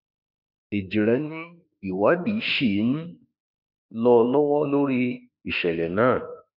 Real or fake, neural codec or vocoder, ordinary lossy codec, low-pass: fake; autoencoder, 48 kHz, 32 numbers a frame, DAC-VAE, trained on Japanese speech; none; 5.4 kHz